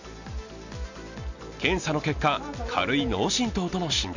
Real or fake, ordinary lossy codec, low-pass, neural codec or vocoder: real; none; 7.2 kHz; none